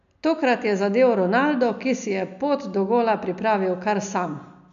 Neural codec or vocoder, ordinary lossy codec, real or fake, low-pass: none; MP3, 96 kbps; real; 7.2 kHz